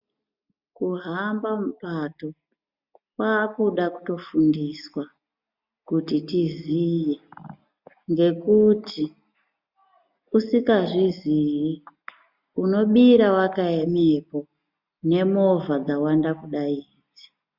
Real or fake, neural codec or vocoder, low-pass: real; none; 5.4 kHz